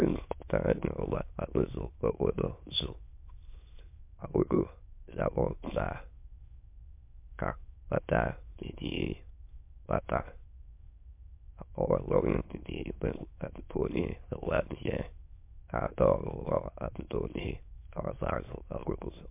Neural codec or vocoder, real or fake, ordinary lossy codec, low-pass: autoencoder, 22.05 kHz, a latent of 192 numbers a frame, VITS, trained on many speakers; fake; MP3, 32 kbps; 3.6 kHz